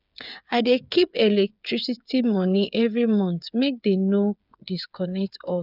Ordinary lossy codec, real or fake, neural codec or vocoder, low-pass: none; fake; codec, 16 kHz, 8 kbps, FreqCodec, smaller model; 5.4 kHz